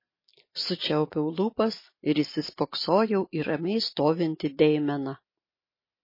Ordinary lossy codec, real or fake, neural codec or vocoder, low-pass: MP3, 24 kbps; real; none; 5.4 kHz